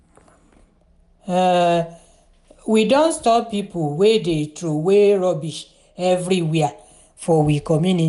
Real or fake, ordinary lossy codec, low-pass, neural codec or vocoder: real; none; 10.8 kHz; none